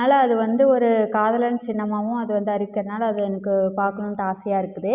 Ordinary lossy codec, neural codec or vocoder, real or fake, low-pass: Opus, 64 kbps; none; real; 3.6 kHz